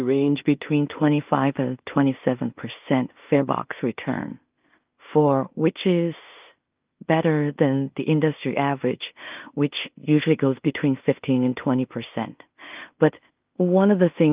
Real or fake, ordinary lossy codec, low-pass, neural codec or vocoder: fake; Opus, 32 kbps; 3.6 kHz; codec, 16 kHz in and 24 kHz out, 0.4 kbps, LongCat-Audio-Codec, two codebook decoder